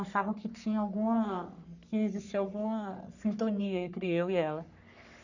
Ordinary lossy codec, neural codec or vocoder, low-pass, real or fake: none; codec, 44.1 kHz, 3.4 kbps, Pupu-Codec; 7.2 kHz; fake